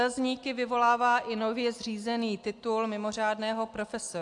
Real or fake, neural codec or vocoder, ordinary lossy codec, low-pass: real; none; AAC, 64 kbps; 10.8 kHz